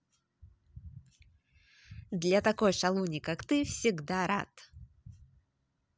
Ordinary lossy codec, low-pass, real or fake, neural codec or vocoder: none; none; real; none